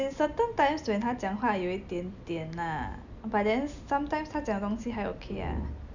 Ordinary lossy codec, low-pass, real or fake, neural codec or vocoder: none; 7.2 kHz; real; none